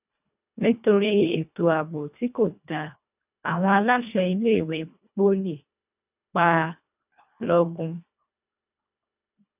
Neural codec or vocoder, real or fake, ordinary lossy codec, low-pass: codec, 24 kHz, 1.5 kbps, HILCodec; fake; none; 3.6 kHz